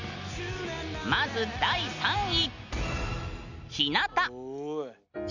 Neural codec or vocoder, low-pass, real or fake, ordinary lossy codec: none; 7.2 kHz; real; none